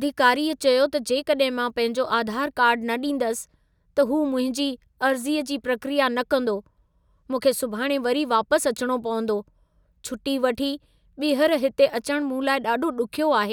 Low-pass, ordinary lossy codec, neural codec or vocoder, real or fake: 19.8 kHz; none; none; real